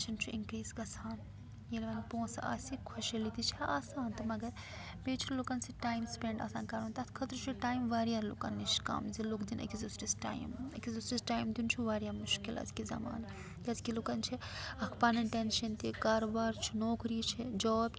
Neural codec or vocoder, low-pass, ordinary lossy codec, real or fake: none; none; none; real